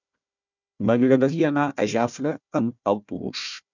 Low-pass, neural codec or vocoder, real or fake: 7.2 kHz; codec, 16 kHz, 1 kbps, FunCodec, trained on Chinese and English, 50 frames a second; fake